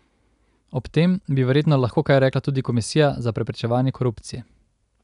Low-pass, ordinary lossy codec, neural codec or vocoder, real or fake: 10.8 kHz; none; none; real